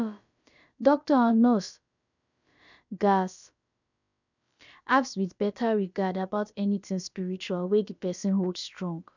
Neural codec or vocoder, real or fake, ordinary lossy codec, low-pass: codec, 16 kHz, about 1 kbps, DyCAST, with the encoder's durations; fake; none; 7.2 kHz